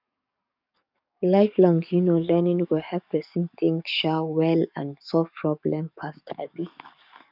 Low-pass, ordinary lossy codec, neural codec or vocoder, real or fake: 5.4 kHz; none; codec, 44.1 kHz, 7.8 kbps, DAC; fake